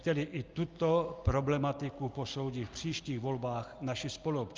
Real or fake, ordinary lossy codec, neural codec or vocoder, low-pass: real; Opus, 24 kbps; none; 7.2 kHz